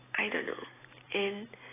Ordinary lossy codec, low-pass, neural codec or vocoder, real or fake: AAC, 16 kbps; 3.6 kHz; none; real